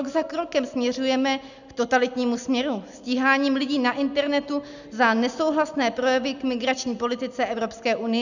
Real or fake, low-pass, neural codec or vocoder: real; 7.2 kHz; none